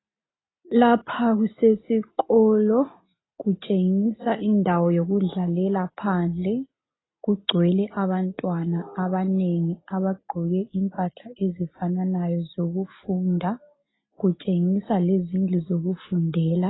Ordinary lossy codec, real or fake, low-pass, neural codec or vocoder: AAC, 16 kbps; real; 7.2 kHz; none